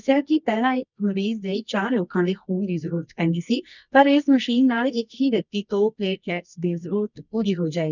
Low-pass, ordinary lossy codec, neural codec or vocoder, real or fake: 7.2 kHz; none; codec, 24 kHz, 0.9 kbps, WavTokenizer, medium music audio release; fake